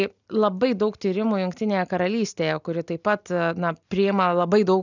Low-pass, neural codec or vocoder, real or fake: 7.2 kHz; none; real